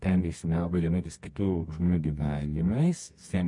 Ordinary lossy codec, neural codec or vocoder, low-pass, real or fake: MP3, 48 kbps; codec, 24 kHz, 0.9 kbps, WavTokenizer, medium music audio release; 10.8 kHz; fake